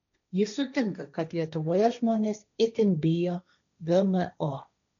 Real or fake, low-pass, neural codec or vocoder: fake; 7.2 kHz; codec, 16 kHz, 1.1 kbps, Voila-Tokenizer